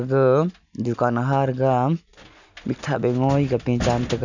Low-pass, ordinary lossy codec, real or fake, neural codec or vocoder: 7.2 kHz; none; real; none